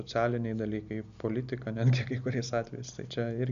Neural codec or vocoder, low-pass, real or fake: none; 7.2 kHz; real